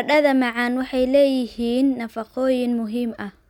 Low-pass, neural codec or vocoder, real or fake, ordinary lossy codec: 19.8 kHz; none; real; none